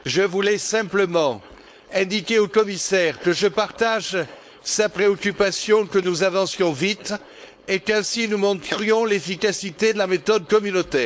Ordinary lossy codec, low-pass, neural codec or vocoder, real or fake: none; none; codec, 16 kHz, 4.8 kbps, FACodec; fake